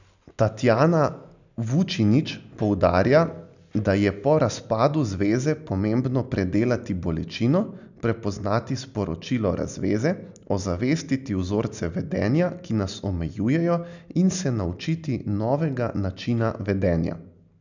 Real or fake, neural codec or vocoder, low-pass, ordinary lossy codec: real; none; 7.2 kHz; none